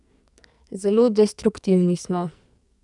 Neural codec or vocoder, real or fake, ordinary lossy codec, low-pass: codec, 44.1 kHz, 2.6 kbps, SNAC; fake; none; 10.8 kHz